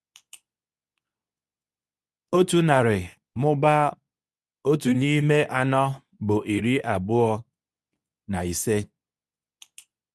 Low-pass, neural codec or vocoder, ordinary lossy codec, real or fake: none; codec, 24 kHz, 0.9 kbps, WavTokenizer, medium speech release version 2; none; fake